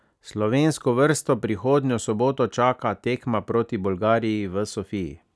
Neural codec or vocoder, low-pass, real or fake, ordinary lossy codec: none; none; real; none